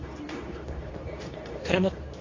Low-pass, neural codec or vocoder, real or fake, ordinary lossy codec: 7.2 kHz; codec, 24 kHz, 0.9 kbps, WavTokenizer, medium speech release version 2; fake; AAC, 32 kbps